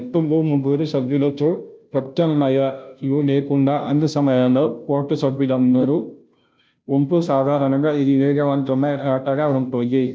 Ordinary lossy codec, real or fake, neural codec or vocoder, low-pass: none; fake; codec, 16 kHz, 0.5 kbps, FunCodec, trained on Chinese and English, 25 frames a second; none